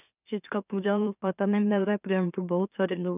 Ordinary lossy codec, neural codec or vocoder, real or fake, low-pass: none; autoencoder, 44.1 kHz, a latent of 192 numbers a frame, MeloTTS; fake; 3.6 kHz